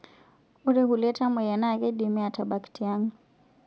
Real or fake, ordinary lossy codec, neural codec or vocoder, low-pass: real; none; none; none